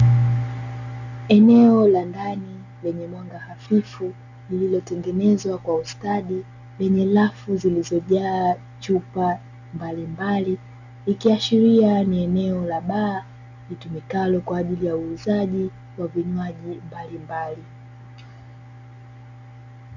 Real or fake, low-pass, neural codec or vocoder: real; 7.2 kHz; none